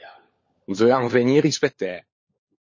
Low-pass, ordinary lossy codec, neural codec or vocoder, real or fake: 7.2 kHz; MP3, 32 kbps; codec, 16 kHz, 16 kbps, FunCodec, trained on LibriTTS, 50 frames a second; fake